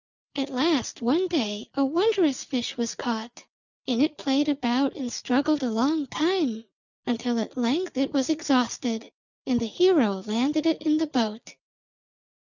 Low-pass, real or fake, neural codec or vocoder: 7.2 kHz; real; none